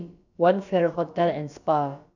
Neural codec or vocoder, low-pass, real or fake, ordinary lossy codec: codec, 16 kHz, about 1 kbps, DyCAST, with the encoder's durations; 7.2 kHz; fake; none